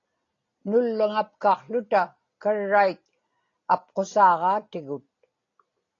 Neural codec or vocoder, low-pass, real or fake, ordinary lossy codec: none; 7.2 kHz; real; AAC, 32 kbps